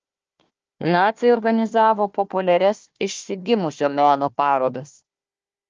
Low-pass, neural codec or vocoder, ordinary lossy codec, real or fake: 7.2 kHz; codec, 16 kHz, 1 kbps, FunCodec, trained on Chinese and English, 50 frames a second; Opus, 24 kbps; fake